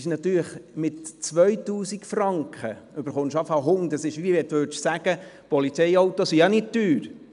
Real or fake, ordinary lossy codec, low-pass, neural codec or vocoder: real; none; 10.8 kHz; none